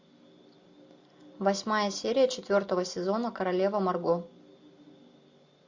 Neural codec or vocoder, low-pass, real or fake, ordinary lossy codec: none; 7.2 kHz; real; MP3, 48 kbps